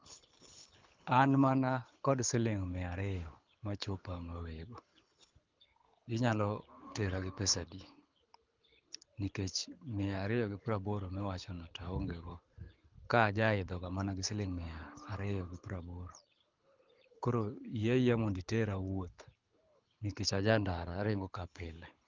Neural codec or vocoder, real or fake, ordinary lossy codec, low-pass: codec, 24 kHz, 6 kbps, HILCodec; fake; Opus, 32 kbps; 7.2 kHz